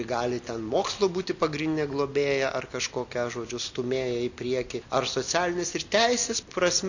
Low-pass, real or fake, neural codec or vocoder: 7.2 kHz; real; none